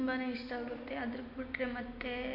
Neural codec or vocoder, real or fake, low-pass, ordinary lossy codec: none; real; 5.4 kHz; MP3, 32 kbps